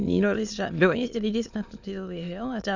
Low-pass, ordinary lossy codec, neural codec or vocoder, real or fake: 7.2 kHz; Opus, 64 kbps; autoencoder, 22.05 kHz, a latent of 192 numbers a frame, VITS, trained on many speakers; fake